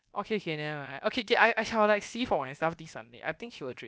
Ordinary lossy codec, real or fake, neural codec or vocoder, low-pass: none; fake; codec, 16 kHz, about 1 kbps, DyCAST, with the encoder's durations; none